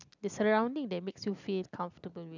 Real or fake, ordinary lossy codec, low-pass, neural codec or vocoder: real; none; 7.2 kHz; none